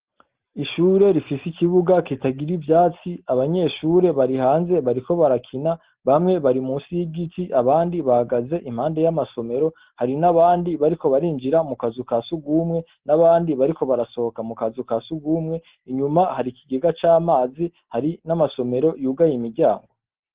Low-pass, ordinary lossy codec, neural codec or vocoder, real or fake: 3.6 kHz; Opus, 16 kbps; none; real